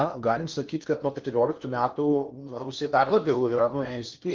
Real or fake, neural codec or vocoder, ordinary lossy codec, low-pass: fake; codec, 16 kHz in and 24 kHz out, 0.8 kbps, FocalCodec, streaming, 65536 codes; Opus, 32 kbps; 7.2 kHz